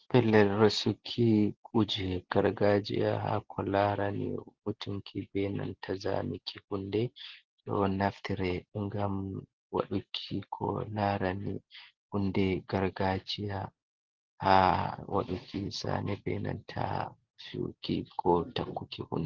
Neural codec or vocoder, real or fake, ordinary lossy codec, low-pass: none; real; Opus, 16 kbps; 7.2 kHz